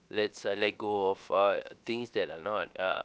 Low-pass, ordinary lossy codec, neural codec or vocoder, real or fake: none; none; codec, 16 kHz, 0.7 kbps, FocalCodec; fake